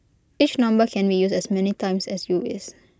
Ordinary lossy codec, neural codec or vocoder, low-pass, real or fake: none; none; none; real